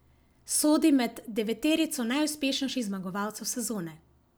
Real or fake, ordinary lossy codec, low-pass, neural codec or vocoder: real; none; none; none